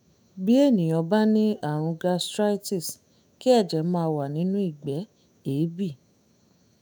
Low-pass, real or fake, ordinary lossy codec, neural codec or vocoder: none; fake; none; autoencoder, 48 kHz, 128 numbers a frame, DAC-VAE, trained on Japanese speech